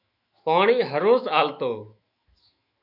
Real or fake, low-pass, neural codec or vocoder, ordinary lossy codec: fake; 5.4 kHz; autoencoder, 48 kHz, 128 numbers a frame, DAC-VAE, trained on Japanese speech; AAC, 48 kbps